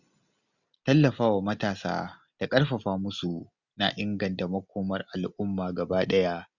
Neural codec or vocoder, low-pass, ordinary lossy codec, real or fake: none; 7.2 kHz; none; real